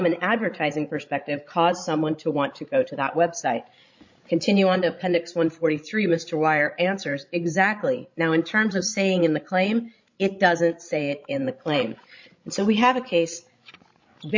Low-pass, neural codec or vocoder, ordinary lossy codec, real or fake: 7.2 kHz; codec, 16 kHz, 16 kbps, FreqCodec, larger model; MP3, 48 kbps; fake